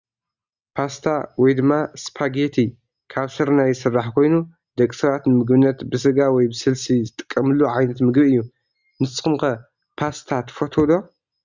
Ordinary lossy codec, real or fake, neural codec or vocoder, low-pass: Opus, 64 kbps; real; none; 7.2 kHz